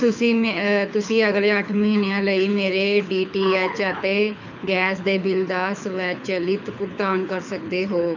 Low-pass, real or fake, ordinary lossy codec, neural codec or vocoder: 7.2 kHz; fake; none; codec, 24 kHz, 6 kbps, HILCodec